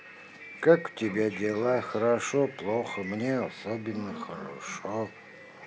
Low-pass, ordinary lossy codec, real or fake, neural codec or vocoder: none; none; real; none